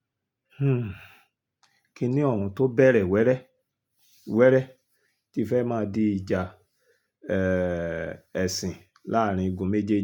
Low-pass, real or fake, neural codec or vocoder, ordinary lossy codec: 19.8 kHz; real; none; none